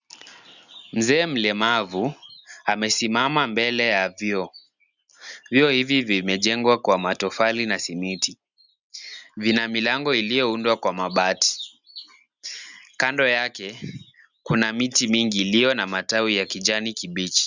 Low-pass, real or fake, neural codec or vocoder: 7.2 kHz; real; none